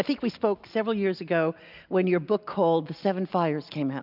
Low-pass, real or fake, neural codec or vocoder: 5.4 kHz; real; none